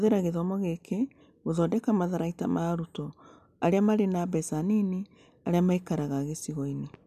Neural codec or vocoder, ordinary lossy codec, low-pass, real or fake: none; none; 14.4 kHz; real